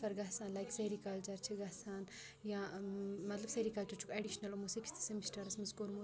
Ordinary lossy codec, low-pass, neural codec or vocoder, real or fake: none; none; none; real